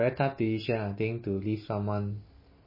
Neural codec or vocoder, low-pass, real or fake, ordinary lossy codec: none; 5.4 kHz; real; MP3, 24 kbps